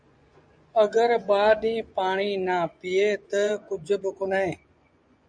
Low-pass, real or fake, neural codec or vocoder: 9.9 kHz; fake; vocoder, 24 kHz, 100 mel bands, Vocos